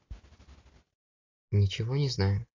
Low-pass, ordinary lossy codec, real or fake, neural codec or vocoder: 7.2 kHz; AAC, 32 kbps; real; none